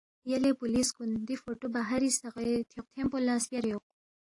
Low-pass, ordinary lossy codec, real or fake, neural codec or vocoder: 10.8 kHz; AAC, 48 kbps; real; none